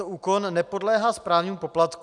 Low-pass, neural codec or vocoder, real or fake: 10.8 kHz; none; real